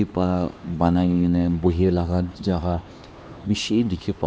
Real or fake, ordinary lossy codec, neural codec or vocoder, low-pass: fake; none; codec, 16 kHz, 2 kbps, X-Codec, HuBERT features, trained on LibriSpeech; none